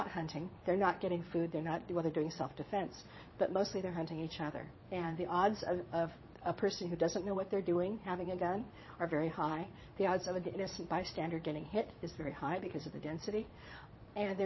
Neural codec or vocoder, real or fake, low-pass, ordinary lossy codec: vocoder, 22.05 kHz, 80 mel bands, Vocos; fake; 7.2 kHz; MP3, 24 kbps